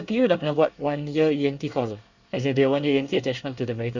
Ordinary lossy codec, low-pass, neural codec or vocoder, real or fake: Opus, 64 kbps; 7.2 kHz; codec, 24 kHz, 1 kbps, SNAC; fake